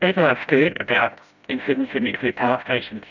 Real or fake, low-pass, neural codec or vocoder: fake; 7.2 kHz; codec, 16 kHz, 0.5 kbps, FreqCodec, smaller model